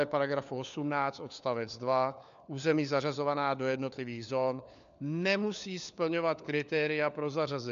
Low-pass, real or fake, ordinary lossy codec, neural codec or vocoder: 7.2 kHz; fake; AAC, 96 kbps; codec, 16 kHz, 4 kbps, FunCodec, trained on LibriTTS, 50 frames a second